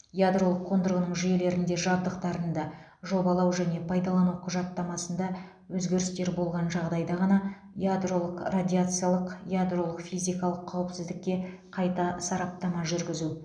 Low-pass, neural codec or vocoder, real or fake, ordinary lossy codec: 9.9 kHz; none; real; none